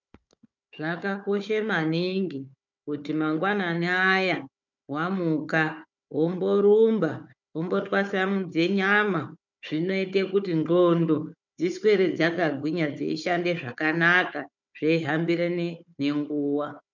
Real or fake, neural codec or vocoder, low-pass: fake; codec, 16 kHz, 4 kbps, FunCodec, trained on Chinese and English, 50 frames a second; 7.2 kHz